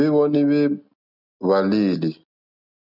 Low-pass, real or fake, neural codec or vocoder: 5.4 kHz; real; none